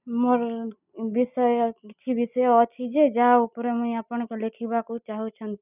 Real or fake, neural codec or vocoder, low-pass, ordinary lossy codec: real; none; 3.6 kHz; none